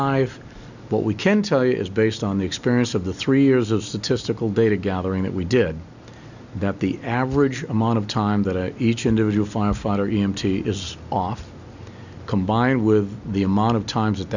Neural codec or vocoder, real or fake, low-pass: none; real; 7.2 kHz